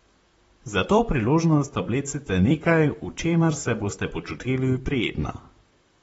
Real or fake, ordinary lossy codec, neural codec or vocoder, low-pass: fake; AAC, 24 kbps; vocoder, 44.1 kHz, 128 mel bands, Pupu-Vocoder; 19.8 kHz